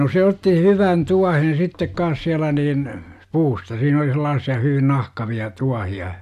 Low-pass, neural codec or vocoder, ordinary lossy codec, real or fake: 14.4 kHz; none; none; real